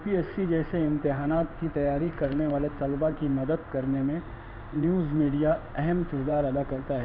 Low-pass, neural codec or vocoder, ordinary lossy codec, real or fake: 5.4 kHz; codec, 16 kHz in and 24 kHz out, 1 kbps, XY-Tokenizer; none; fake